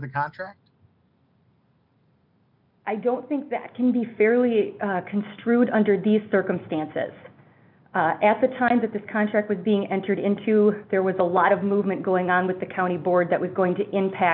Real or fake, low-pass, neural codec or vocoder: real; 5.4 kHz; none